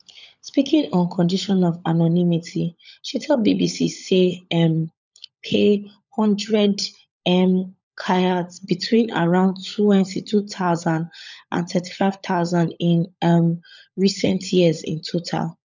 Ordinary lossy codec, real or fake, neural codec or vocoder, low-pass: none; fake; codec, 16 kHz, 16 kbps, FunCodec, trained on LibriTTS, 50 frames a second; 7.2 kHz